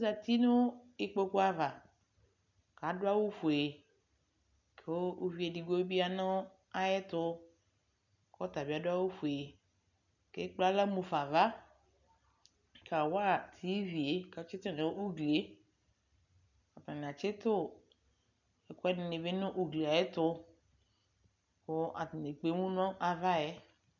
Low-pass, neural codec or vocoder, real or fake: 7.2 kHz; none; real